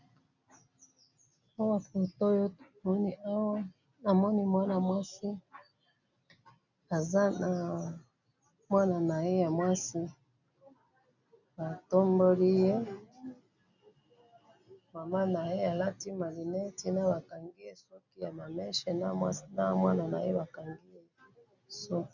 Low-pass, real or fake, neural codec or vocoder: 7.2 kHz; real; none